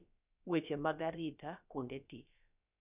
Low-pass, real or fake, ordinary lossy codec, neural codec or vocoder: 3.6 kHz; fake; none; codec, 16 kHz, about 1 kbps, DyCAST, with the encoder's durations